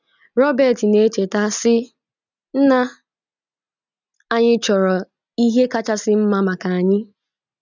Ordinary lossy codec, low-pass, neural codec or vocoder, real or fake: none; 7.2 kHz; none; real